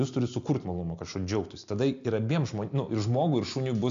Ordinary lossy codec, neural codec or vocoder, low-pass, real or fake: AAC, 64 kbps; none; 7.2 kHz; real